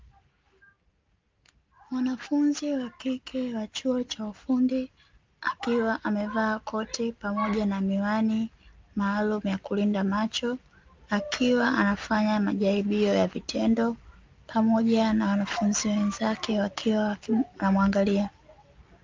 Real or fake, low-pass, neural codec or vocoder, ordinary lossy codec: real; 7.2 kHz; none; Opus, 24 kbps